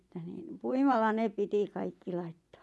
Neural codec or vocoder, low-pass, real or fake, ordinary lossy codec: vocoder, 24 kHz, 100 mel bands, Vocos; none; fake; none